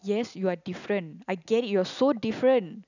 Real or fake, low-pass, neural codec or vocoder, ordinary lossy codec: real; 7.2 kHz; none; none